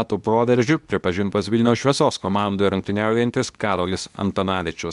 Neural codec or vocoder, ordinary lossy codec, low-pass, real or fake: codec, 24 kHz, 0.9 kbps, WavTokenizer, medium speech release version 2; MP3, 96 kbps; 10.8 kHz; fake